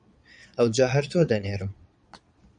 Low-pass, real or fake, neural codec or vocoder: 9.9 kHz; fake; vocoder, 22.05 kHz, 80 mel bands, Vocos